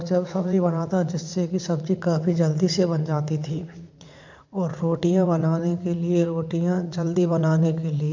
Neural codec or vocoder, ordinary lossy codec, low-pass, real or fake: vocoder, 44.1 kHz, 80 mel bands, Vocos; MP3, 64 kbps; 7.2 kHz; fake